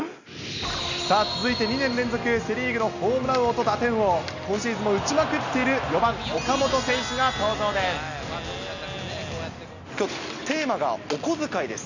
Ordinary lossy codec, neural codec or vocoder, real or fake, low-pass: none; none; real; 7.2 kHz